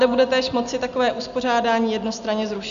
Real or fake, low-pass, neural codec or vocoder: real; 7.2 kHz; none